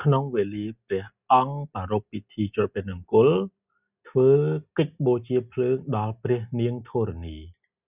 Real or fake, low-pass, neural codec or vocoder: real; 3.6 kHz; none